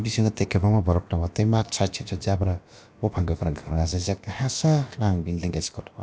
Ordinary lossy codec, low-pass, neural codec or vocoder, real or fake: none; none; codec, 16 kHz, about 1 kbps, DyCAST, with the encoder's durations; fake